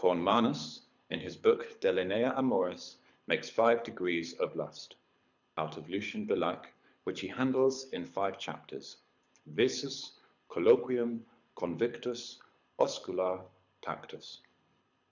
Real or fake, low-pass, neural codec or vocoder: fake; 7.2 kHz; codec, 24 kHz, 6 kbps, HILCodec